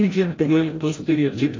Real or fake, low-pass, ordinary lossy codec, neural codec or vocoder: fake; 7.2 kHz; AAC, 32 kbps; codec, 16 kHz, 0.5 kbps, FreqCodec, larger model